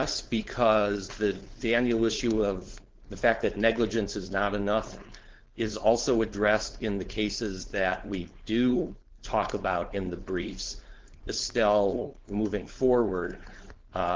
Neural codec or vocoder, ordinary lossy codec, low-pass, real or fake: codec, 16 kHz, 4.8 kbps, FACodec; Opus, 16 kbps; 7.2 kHz; fake